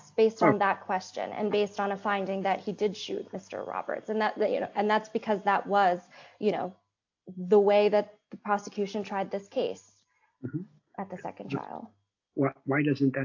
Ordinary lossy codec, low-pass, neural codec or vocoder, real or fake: AAC, 48 kbps; 7.2 kHz; none; real